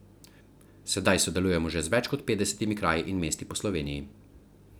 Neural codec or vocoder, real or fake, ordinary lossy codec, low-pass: none; real; none; none